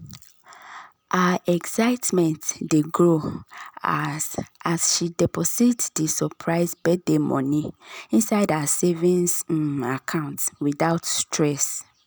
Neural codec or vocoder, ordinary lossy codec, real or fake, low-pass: none; none; real; none